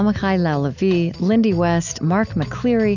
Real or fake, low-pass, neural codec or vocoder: real; 7.2 kHz; none